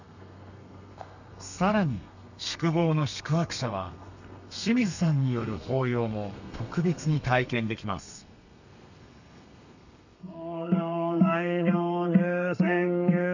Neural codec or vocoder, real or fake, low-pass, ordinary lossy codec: codec, 32 kHz, 1.9 kbps, SNAC; fake; 7.2 kHz; none